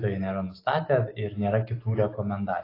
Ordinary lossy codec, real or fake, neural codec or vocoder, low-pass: AAC, 24 kbps; real; none; 5.4 kHz